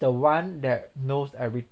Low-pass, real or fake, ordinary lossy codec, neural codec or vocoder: none; real; none; none